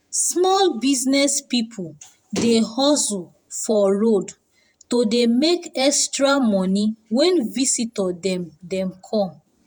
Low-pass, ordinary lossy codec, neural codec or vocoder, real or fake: 19.8 kHz; none; vocoder, 48 kHz, 128 mel bands, Vocos; fake